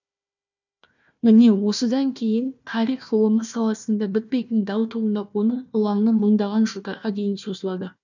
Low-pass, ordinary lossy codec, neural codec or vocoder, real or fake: 7.2 kHz; none; codec, 16 kHz, 1 kbps, FunCodec, trained on Chinese and English, 50 frames a second; fake